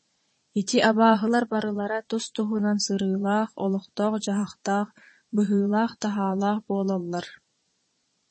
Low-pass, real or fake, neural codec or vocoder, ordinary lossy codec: 9.9 kHz; fake; vocoder, 22.05 kHz, 80 mel bands, Vocos; MP3, 32 kbps